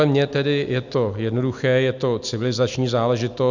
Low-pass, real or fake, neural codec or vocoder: 7.2 kHz; real; none